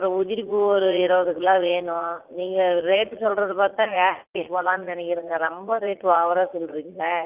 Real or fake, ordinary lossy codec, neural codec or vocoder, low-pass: fake; Opus, 32 kbps; vocoder, 44.1 kHz, 80 mel bands, Vocos; 3.6 kHz